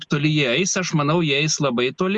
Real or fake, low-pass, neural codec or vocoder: real; 10.8 kHz; none